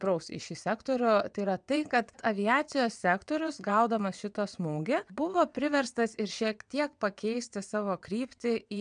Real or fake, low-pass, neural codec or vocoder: fake; 9.9 kHz; vocoder, 22.05 kHz, 80 mel bands, WaveNeXt